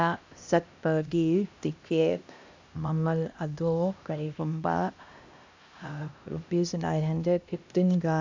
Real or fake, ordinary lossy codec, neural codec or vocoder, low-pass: fake; MP3, 64 kbps; codec, 16 kHz, 1 kbps, X-Codec, HuBERT features, trained on LibriSpeech; 7.2 kHz